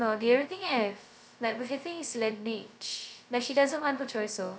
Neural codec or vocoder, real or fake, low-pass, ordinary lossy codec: codec, 16 kHz, 0.2 kbps, FocalCodec; fake; none; none